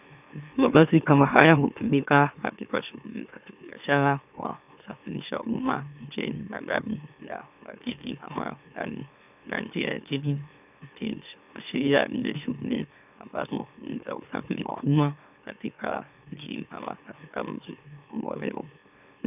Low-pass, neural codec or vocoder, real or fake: 3.6 kHz; autoencoder, 44.1 kHz, a latent of 192 numbers a frame, MeloTTS; fake